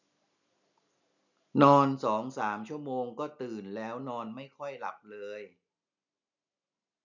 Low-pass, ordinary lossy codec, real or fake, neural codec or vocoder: 7.2 kHz; none; real; none